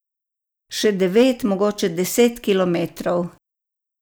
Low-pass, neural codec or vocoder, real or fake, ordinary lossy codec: none; none; real; none